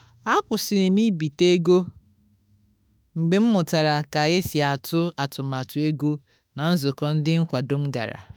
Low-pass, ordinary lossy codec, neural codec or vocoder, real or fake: none; none; autoencoder, 48 kHz, 32 numbers a frame, DAC-VAE, trained on Japanese speech; fake